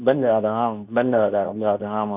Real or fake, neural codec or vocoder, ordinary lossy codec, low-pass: fake; codec, 24 kHz, 0.9 kbps, WavTokenizer, medium speech release version 2; Opus, 64 kbps; 3.6 kHz